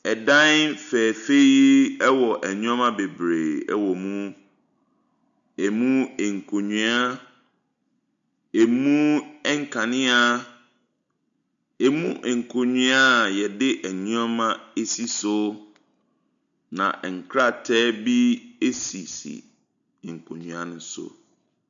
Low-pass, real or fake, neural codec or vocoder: 7.2 kHz; real; none